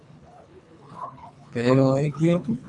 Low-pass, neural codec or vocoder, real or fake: 10.8 kHz; codec, 24 kHz, 3 kbps, HILCodec; fake